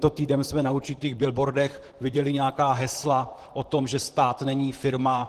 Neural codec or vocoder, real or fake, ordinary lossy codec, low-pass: vocoder, 44.1 kHz, 128 mel bands, Pupu-Vocoder; fake; Opus, 16 kbps; 14.4 kHz